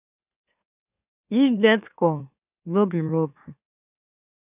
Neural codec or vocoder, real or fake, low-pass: autoencoder, 44.1 kHz, a latent of 192 numbers a frame, MeloTTS; fake; 3.6 kHz